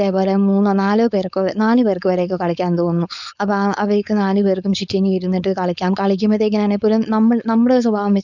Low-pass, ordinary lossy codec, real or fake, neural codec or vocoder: 7.2 kHz; none; fake; codec, 16 kHz, 4.8 kbps, FACodec